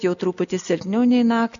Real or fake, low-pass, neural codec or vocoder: real; 7.2 kHz; none